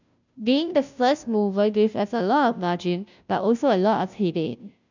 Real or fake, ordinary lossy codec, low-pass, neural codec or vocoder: fake; none; 7.2 kHz; codec, 16 kHz, 0.5 kbps, FunCodec, trained on Chinese and English, 25 frames a second